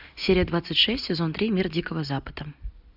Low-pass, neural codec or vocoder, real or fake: 5.4 kHz; none; real